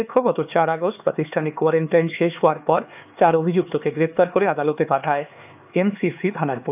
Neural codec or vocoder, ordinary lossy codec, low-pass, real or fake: codec, 16 kHz, 4 kbps, X-Codec, HuBERT features, trained on LibriSpeech; AAC, 32 kbps; 3.6 kHz; fake